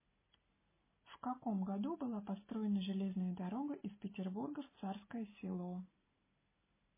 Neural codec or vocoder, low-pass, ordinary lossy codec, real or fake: none; 3.6 kHz; MP3, 16 kbps; real